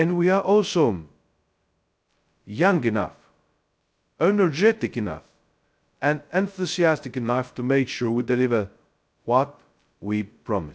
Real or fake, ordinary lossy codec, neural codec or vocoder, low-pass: fake; none; codec, 16 kHz, 0.2 kbps, FocalCodec; none